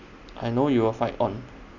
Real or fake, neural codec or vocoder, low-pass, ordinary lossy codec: real; none; 7.2 kHz; none